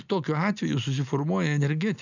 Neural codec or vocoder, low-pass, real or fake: none; 7.2 kHz; real